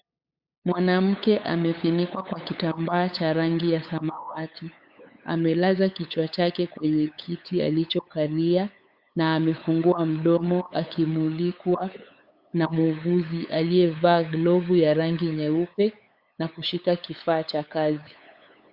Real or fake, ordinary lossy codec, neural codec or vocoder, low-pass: fake; Opus, 64 kbps; codec, 16 kHz, 8 kbps, FunCodec, trained on LibriTTS, 25 frames a second; 5.4 kHz